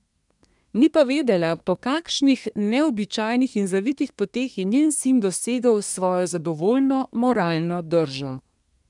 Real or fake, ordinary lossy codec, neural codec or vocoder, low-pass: fake; none; codec, 24 kHz, 1 kbps, SNAC; 10.8 kHz